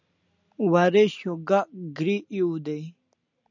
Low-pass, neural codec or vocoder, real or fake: 7.2 kHz; none; real